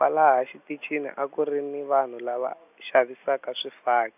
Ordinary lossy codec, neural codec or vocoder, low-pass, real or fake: none; none; 3.6 kHz; real